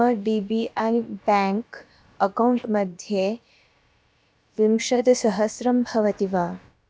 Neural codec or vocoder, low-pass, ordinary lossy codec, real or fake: codec, 16 kHz, about 1 kbps, DyCAST, with the encoder's durations; none; none; fake